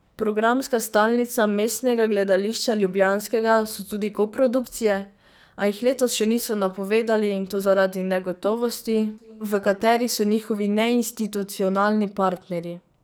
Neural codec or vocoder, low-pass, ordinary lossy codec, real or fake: codec, 44.1 kHz, 2.6 kbps, SNAC; none; none; fake